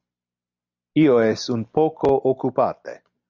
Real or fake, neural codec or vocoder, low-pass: real; none; 7.2 kHz